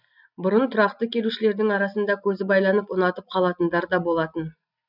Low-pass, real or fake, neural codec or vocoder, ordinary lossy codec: 5.4 kHz; real; none; none